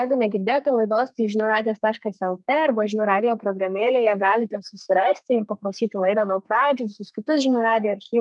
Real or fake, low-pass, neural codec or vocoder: fake; 10.8 kHz; codec, 32 kHz, 1.9 kbps, SNAC